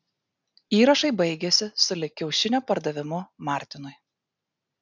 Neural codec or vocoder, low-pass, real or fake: none; 7.2 kHz; real